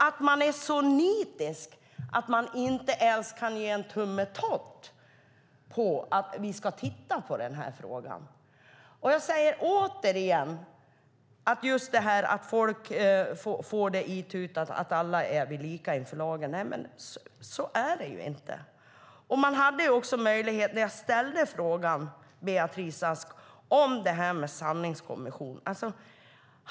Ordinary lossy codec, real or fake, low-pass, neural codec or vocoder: none; real; none; none